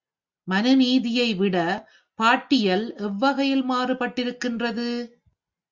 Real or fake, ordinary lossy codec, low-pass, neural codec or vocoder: real; Opus, 64 kbps; 7.2 kHz; none